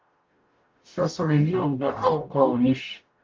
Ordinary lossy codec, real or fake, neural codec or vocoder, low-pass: Opus, 24 kbps; fake; codec, 44.1 kHz, 0.9 kbps, DAC; 7.2 kHz